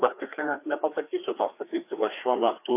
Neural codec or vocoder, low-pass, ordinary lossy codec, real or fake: codec, 16 kHz, 2 kbps, FreqCodec, larger model; 3.6 kHz; AAC, 24 kbps; fake